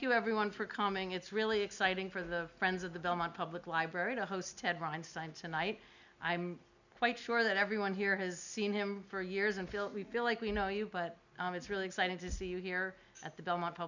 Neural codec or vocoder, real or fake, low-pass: none; real; 7.2 kHz